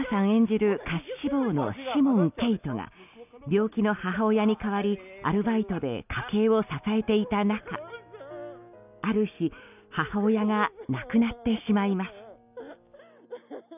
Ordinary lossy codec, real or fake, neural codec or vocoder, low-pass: none; real; none; 3.6 kHz